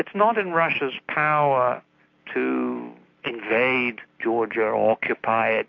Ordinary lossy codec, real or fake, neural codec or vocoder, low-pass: MP3, 48 kbps; real; none; 7.2 kHz